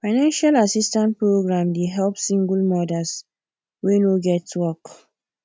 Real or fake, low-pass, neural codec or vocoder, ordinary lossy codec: real; none; none; none